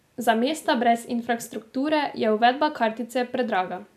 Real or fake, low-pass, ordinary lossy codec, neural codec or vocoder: real; 14.4 kHz; none; none